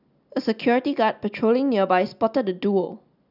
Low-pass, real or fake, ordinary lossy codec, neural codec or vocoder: 5.4 kHz; real; none; none